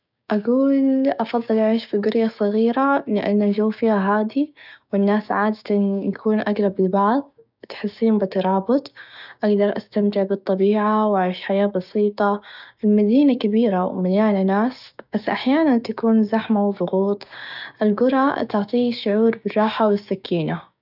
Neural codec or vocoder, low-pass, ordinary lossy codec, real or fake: codec, 16 kHz, 6 kbps, DAC; 5.4 kHz; none; fake